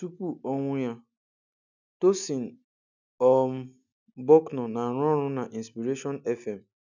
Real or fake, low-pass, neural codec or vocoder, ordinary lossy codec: real; 7.2 kHz; none; none